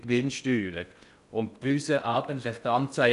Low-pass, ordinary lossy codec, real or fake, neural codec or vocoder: 10.8 kHz; none; fake; codec, 16 kHz in and 24 kHz out, 0.6 kbps, FocalCodec, streaming, 2048 codes